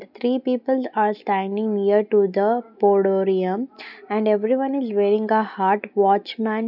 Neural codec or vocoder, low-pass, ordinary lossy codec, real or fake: none; 5.4 kHz; none; real